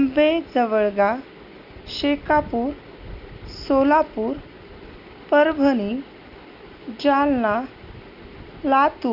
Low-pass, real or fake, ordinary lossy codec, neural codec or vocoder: 5.4 kHz; real; none; none